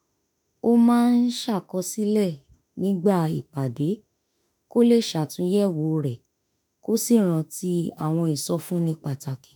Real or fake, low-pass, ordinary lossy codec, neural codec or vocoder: fake; none; none; autoencoder, 48 kHz, 32 numbers a frame, DAC-VAE, trained on Japanese speech